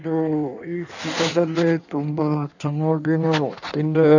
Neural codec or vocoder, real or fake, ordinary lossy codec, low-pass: codec, 16 kHz in and 24 kHz out, 1.1 kbps, FireRedTTS-2 codec; fake; none; 7.2 kHz